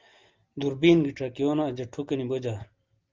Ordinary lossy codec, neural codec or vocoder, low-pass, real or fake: Opus, 32 kbps; none; 7.2 kHz; real